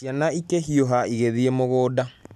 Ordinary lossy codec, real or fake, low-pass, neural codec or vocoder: none; real; 14.4 kHz; none